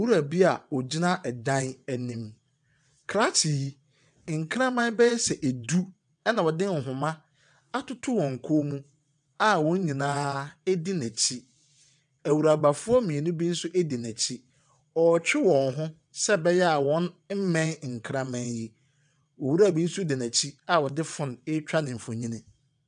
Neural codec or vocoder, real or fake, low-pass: vocoder, 22.05 kHz, 80 mel bands, WaveNeXt; fake; 9.9 kHz